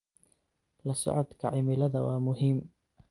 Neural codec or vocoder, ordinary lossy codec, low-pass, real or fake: none; Opus, 32 kbps; 10.8 kHz; real